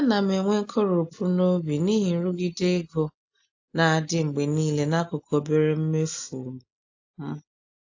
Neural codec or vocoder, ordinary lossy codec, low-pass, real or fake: none; AAC, 48 kbps; 7.2 kHz; real